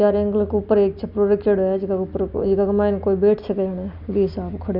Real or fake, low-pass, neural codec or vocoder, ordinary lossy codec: real; 5.4 kHz; none; none